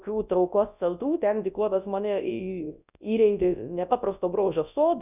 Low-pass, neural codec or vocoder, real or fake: 3.6 kHz; codec, 24 kHz, 0.9 kbps, WavTokenizer, large speech release; fake